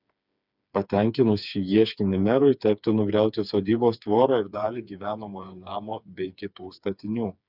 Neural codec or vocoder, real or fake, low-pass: codec, 16 kHz, 4 kbps, FreqCodec, smaller model; fake; 5.4 kHz